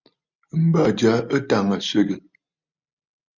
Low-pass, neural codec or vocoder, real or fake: 7.2 kHz; none; real